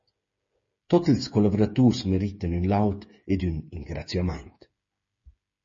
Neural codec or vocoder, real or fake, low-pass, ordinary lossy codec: codec, 16 kHz, 8 kbps, FreqCodec, smaller model; fake; 7.2 kHz; MP3, 32 kbps